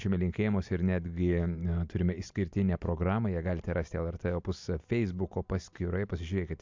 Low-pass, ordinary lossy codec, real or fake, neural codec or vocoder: 7.2 kHz; MP3, 64 kbps; real; none